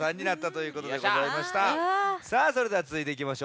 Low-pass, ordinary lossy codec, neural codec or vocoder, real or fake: none; none; none; real